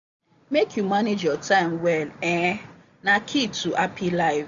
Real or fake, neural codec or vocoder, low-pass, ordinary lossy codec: real; none; 7.2 kHz; none